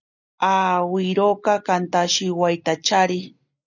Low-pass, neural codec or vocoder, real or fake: 7.2 kHz; none; real